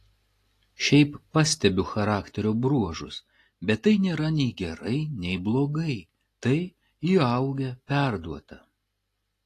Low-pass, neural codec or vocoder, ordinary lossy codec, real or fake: 14.4 kHz; none; AAC, 48 kbps; real